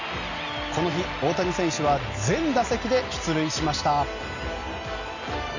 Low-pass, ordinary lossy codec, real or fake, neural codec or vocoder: 7.2 kHz; none; real; none